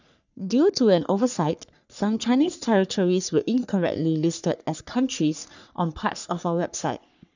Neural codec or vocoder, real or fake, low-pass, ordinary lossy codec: codec, 44.1 kHz, 3.4 kbps, Pupu-Codec; fake; 7.2 kHz; none